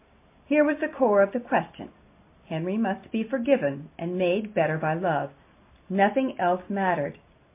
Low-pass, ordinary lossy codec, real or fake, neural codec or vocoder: 3.6 kHz; MP3, 24 kbps; real; none